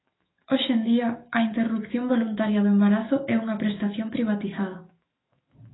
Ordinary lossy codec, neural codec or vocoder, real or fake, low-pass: AAC, 16 kbps; autoencoder, 48 kHz, 128 numbers a frame, DAC-VAE, trained on Japanese speech; fake; 7.2 kHz